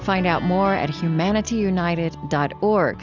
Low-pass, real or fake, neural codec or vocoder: 7.2 kHz; real; none